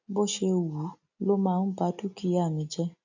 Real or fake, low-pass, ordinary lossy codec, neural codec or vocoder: real; 7.2 kHz; none; none